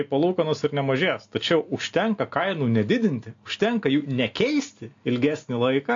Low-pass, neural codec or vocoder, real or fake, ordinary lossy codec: 7.2 kHz; none; real; AAC, 48 kbps